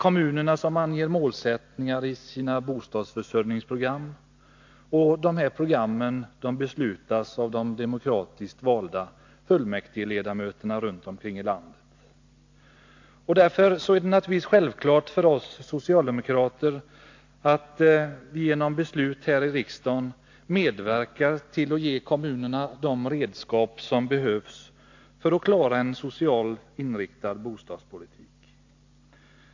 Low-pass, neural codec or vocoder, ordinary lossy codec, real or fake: 7.2 kHz; none; AAC, 48 kbps; real